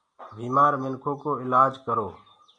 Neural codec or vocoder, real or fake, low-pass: none; real; 9.9 kHz